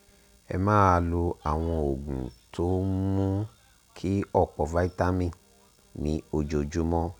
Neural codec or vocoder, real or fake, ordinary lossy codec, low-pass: none; real; none; 19.8 kHz